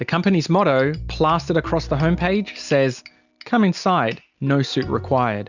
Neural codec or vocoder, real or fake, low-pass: none; real; 7.2 kHz